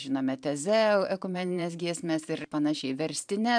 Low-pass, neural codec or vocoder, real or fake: 9.9 kHz; vocoder, 44.1 kHz, 128 mel bands every 512 samples, BigVGAN v2; fake